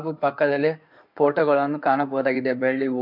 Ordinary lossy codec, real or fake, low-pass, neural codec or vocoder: MP3, 48 kbps; fake; 5.4 kHz; codec, 16 kHz in and 24 kHz out, 2.2 kbps, FireRedTTS-2 codec